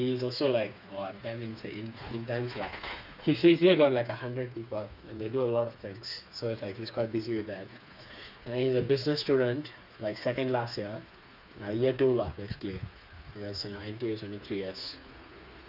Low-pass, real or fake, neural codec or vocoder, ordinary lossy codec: 5.4 kHz; fake; codec, 16 kHz, 4 kbps, FreqCodec, smaller model; none